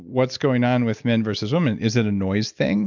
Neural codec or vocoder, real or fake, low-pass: none; real; 7.2 kHz